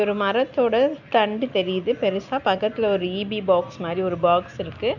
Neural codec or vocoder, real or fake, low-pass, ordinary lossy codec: none; real; 7.2 kHz; none